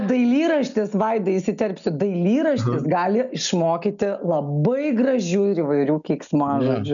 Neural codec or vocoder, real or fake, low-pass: none; real; 7.2 kHz